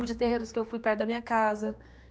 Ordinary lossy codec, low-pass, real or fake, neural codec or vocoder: none; none; fake; codec, 16 kHz, 2 kbps, X-Codec, HuBERT features, trained on general audio